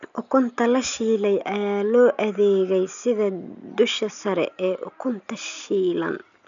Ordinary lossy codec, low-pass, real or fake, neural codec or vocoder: none; 7.2 kHz; real; none